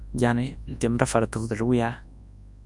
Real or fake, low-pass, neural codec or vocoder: fake; 10.8 kHz; codec, 24 kHz, 0.9 kbps, WavTokenizer, large speech release